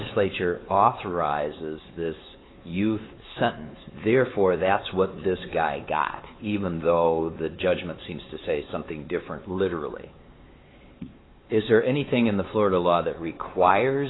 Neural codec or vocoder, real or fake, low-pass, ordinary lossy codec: codec, 16 kHz, 4 kbps, X-Codec, WavLM features, trained on Multilingual LibriSpeech; fake; 7.2 kHz; AAC, 16 kbps